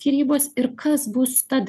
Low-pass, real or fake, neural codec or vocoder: 14.4 kHz; real; none